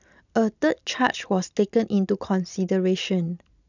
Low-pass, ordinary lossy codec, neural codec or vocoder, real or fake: 7.2 kHz; none; none; real